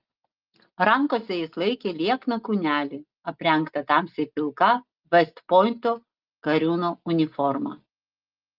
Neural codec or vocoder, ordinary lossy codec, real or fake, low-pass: none; Opus, 24 kbps; real; 5.4 kHz